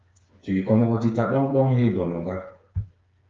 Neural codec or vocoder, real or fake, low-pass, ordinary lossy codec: codec, 16 kHz, 4 kbps, FreqCodec, smaller model; fake; 7.2 kHz; Opus, 32 kbps